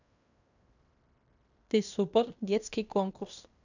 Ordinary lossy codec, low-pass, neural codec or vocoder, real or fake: none; 7.2 kHz; codec, 16 kHz in and 24 kHz out, 0.9 kbps, LongCat-Audio-Codec, fine tuned four codebook decoder; fake